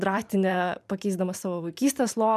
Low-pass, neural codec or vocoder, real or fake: 14.4 kHz; vocoder, 44.1 kHz, 128 mel bands every 512 samples, BigVGAN v2; fake